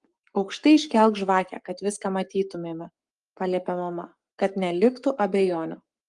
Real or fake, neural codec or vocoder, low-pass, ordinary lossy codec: fake; codec, 44.1 kHz, 7.8 kbps, Pupu-Codec; 10.8 kHz; Opus, 32 kbps